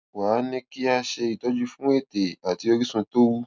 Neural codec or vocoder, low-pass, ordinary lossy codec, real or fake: none; none; none; real